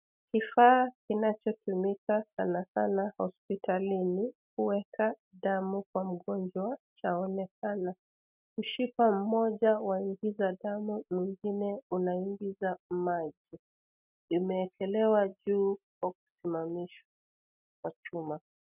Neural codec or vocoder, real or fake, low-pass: none; real; 3.6 kHz